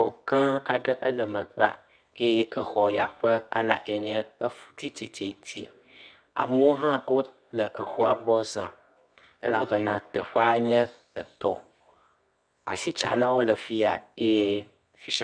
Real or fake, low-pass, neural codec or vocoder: fake; 9.9 kHz; codec, 24 kHz, 0.9 kbps, WavTokenizer, medium music audio release